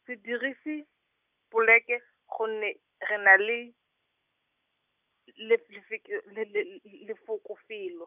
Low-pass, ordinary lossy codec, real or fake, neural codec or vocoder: 3.6 kHz; none; real; none